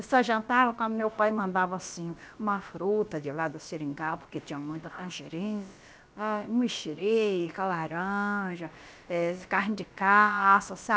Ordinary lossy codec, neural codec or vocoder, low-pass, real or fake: none; codec, 16 kHz, about 1 kbps, DyCAST, with the encoder's durations; none; fake